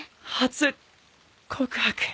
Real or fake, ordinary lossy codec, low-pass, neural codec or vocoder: real; none; none; none